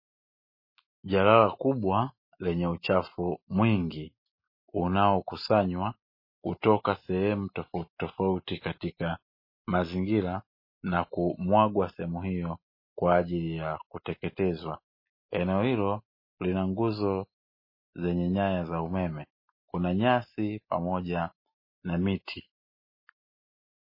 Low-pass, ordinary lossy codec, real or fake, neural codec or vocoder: 5.4 kHz; MP3, 24 kbps; real; none